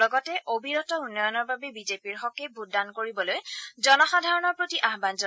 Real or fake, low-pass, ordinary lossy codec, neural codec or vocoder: real; none; none; none